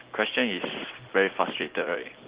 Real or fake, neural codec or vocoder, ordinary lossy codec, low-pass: real; none; Opus, 16 kbps; 3.6 kHz